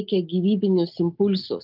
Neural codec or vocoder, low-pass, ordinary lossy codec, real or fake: none; 5.4 kHz; Opus, 24 kbps; real